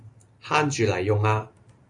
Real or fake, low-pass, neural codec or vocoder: real; 10.8 kHz; none